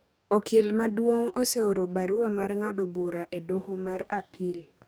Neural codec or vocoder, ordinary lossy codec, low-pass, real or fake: codec, 44.1 kHz, 2.6 kbps, DAC; none; none; fake